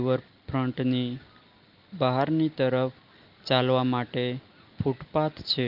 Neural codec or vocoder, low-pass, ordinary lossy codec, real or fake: none; 5.4 kHz; Opus, 32 kbps; real